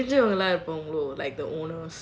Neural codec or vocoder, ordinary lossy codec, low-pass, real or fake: none; none; none; real